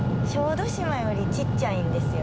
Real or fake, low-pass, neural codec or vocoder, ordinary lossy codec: real; none; none; none